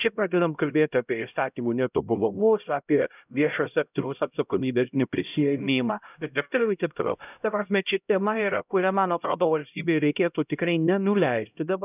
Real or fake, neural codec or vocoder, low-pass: fake; codec, 16 kHz, 0.5 kbps, X-Codec, HuBERT features, trained on LibriSpeech; 3.6 kHz